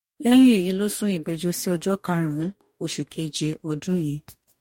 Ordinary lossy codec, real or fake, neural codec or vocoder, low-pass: MP3, 64 kbps; fake; codec, 44.1 kHz, 2.6 kbps, DAC; 19.8 kHz